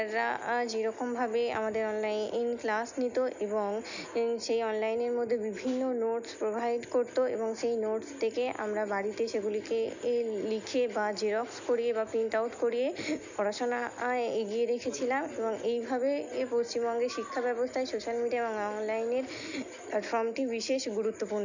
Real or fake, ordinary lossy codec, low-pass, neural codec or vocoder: real; none; 7.2 kHz; none